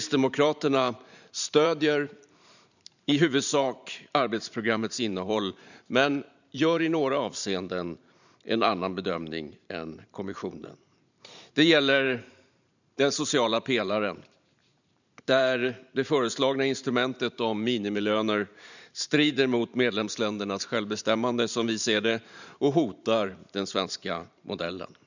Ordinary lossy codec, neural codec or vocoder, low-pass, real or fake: none; vocoder, 44.1 kHz, 128 mel bands every 512 samples, BigVGAN v2; 7.2 kHz; fake